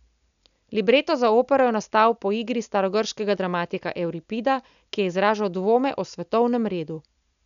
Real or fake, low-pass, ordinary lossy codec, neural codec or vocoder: real; 7.2 kHz; none; none